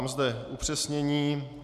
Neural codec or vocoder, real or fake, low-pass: none; real; 14.4 kHz